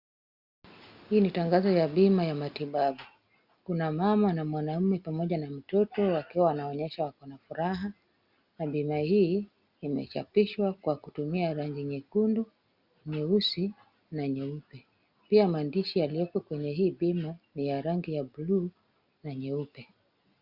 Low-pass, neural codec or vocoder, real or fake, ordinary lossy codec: 5.4 kHz; none; real; Opus, 64 kbps